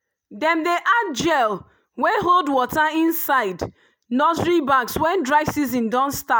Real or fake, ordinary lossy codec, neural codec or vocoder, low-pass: real; none; none; none